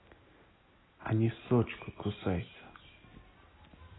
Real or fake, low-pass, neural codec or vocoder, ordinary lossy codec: real; 7.2 kHz; none; AAC, 16 kbps